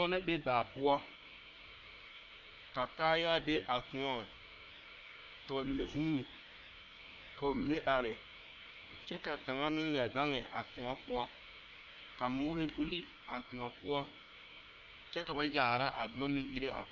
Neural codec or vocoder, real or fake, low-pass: codec, 24 kHz, 1 kbps, SNAC; fake; 7.2 kHz